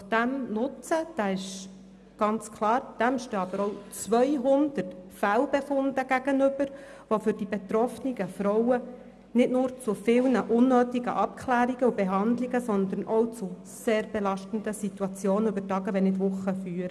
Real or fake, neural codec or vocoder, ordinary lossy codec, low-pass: real; none; none; none